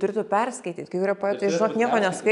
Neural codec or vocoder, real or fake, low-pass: none; real; 10.8 kHz